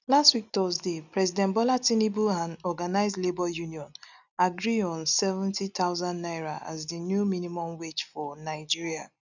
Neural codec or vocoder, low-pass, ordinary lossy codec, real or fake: none; 7.2 kHz; none; real